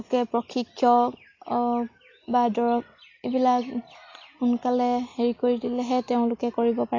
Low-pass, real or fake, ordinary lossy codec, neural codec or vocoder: 7.2 kHz; real; AAC, 32 kbps; none